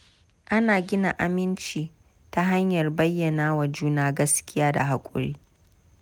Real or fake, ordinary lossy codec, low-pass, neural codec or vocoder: real; none; none; none